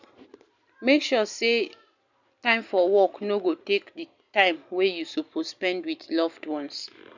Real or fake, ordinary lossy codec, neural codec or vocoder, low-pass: real; none; none; 7.2 kHz